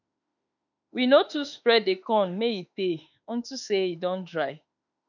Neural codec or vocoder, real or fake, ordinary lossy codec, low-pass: autoencoder, 48 kHz, 32 numbers a frame, DAC-VAE, trained on Japanese speech; fake; none; 7.2 kHz